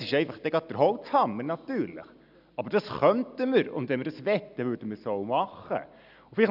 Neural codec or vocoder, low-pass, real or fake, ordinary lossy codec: none; 5.4 kHz; real; none